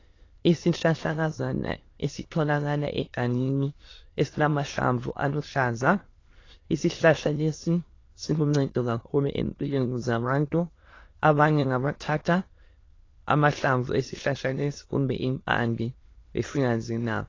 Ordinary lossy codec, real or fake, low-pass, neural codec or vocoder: AAC, 32 kbps; fake; 7.2 kHz; autoencoder, 22.05 kHz, a latent of 192 numbers a frame, VITS, trained on many speakers